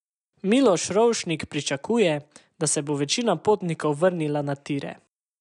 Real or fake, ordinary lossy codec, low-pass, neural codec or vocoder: real; none; 10.8 kHz; none